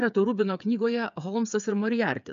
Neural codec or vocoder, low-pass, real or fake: codec, 16 kHz, 8 kbps, FreqCodec, smaller model; 7.2 kHz; fake